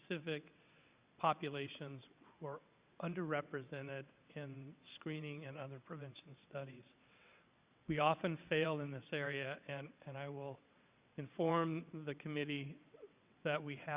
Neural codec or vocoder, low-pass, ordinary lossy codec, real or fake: vocoder, 22.05 kHz, 80 mel bands, WaveNeXt; 3.6 kHz; Opus, 64 kbps; fake